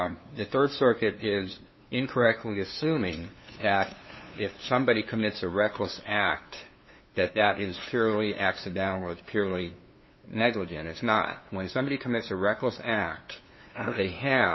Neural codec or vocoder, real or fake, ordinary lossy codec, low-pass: codec, 16 kHz, 2 kbps, FunCodec, trained on LibriTTS, 25 frames a second; fake; MP3, 24 kbps; 7.2 kHz